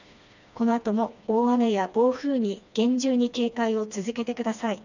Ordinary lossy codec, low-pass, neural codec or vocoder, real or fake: none; 7.2 kHz; codec, 16 kHz, 2 kbps, FreqCodec, smaller model; fake